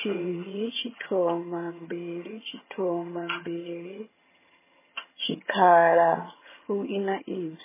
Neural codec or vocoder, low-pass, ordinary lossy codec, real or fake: vocoder, 22.05 kHz, 80 mel bands, HiFi-GAN; 3.6 kHz; MP3, 16 kbps; fake